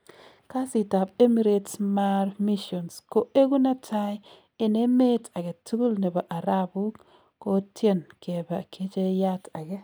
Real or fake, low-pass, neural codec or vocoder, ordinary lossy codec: real; none; none; none